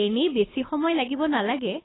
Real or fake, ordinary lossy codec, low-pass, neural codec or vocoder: fake; AAC, 16 kbps; 7.2 kHz; codec, 24 kHz, 6 kbps, HILCodec